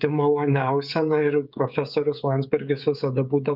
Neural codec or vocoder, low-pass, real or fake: codec, 16 kHz, 16 kbps, FreqCodec, smaller model; 5.4 kHz; fake